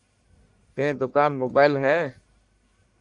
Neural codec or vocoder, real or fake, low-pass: codec, 44.1 kHz, 1.7 kbps, Pupu-Codec; fake; 10.8 kHz